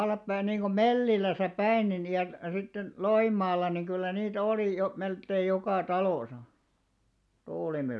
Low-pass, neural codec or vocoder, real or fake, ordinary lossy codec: none; none; real; none